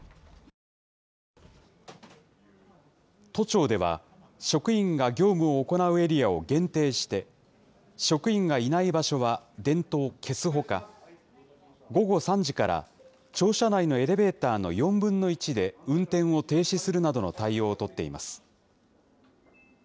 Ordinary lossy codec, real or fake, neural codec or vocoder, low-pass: none; real; none; none